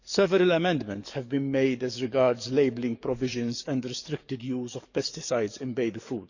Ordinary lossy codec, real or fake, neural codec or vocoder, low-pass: none; fake; codec, 44.1 kHz, 7.8 kbps, DAC; 7.2 kHz